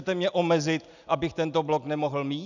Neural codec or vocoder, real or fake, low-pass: none; real; 7.2 kHz